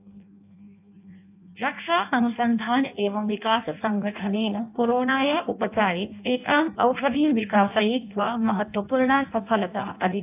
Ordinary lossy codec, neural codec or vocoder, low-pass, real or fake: AAC, 32 kbps; codec, 16 kHz in and 24 kHz out, 0.6 kbps, FireRedTTS-2 codec; 3.6 kHz; fake